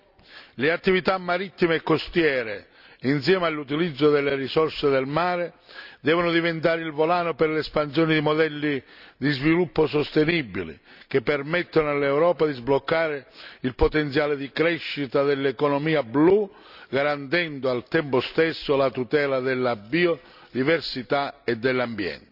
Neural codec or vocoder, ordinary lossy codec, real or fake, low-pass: none; none; real; 5.4 kHz